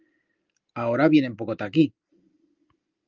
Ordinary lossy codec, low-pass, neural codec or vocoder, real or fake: Opus, 32 kbps; 7.2 kHz; none; real